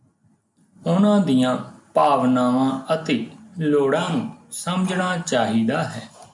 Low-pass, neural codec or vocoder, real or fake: 10.8 kHz; none; real